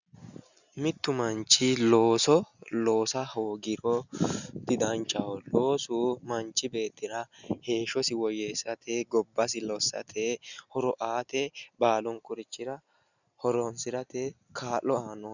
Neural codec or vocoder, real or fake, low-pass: none; real; 7.2 kHz